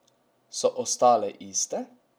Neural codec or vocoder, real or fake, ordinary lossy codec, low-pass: none; real; none; none